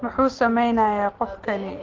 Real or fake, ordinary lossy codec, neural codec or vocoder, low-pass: real; Opus, 16 kbps; none; 7.2 kHz